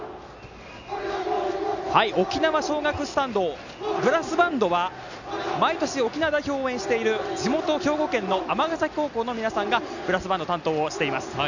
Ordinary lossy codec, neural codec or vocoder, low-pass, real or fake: none; none; 7.2 kHz; real